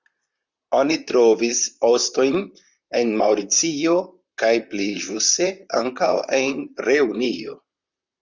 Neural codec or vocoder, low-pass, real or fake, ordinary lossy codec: vocoder, 44.1 kHz, 128 mel bands, Pupu-Vocoder; 7.2 kHz; fake; Opus, 64 kbps